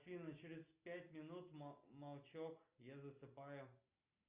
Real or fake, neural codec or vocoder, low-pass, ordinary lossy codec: real; none; 3.6 kHz; Opus, 64 kbps